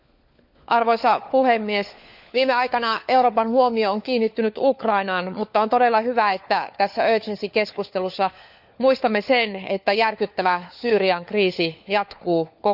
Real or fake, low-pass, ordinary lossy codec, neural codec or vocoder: fake; 5.4 kHz; none; codec, 16 kHz, 4 kbps, FunCodec, trained on LibriTTS, 50 frames a second